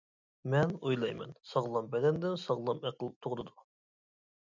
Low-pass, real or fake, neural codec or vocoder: 7.2 kHz; real; none